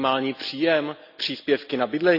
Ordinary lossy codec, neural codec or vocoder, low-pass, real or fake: none; none; 5.4 kHz; real